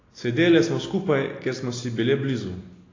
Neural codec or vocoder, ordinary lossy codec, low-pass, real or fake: none; AAC, 32 kbps; 7.2 kHz; real